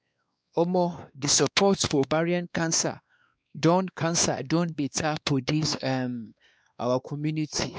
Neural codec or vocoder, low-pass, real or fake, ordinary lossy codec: codec, 16 kHz, 2 kbps, X-Codec, WavLM features, trained on Multilingual LibriSpeech; none; fake; none